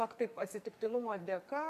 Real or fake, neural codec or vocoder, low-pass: fake; codec, 44.1 kHz, 2.6 kbps, SNAC; 14.4 kHz